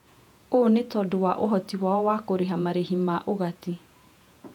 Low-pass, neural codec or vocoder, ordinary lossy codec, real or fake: 19.8 kHz; vocoder, 48 kHz, 128 mel bands, Vocos; none; fake